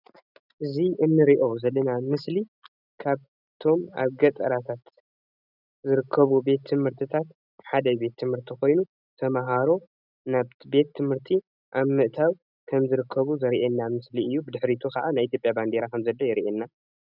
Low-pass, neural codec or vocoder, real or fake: 5.4 kHz; none; real